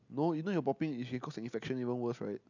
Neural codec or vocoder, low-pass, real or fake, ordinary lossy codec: none; 7.2 kHz; real; none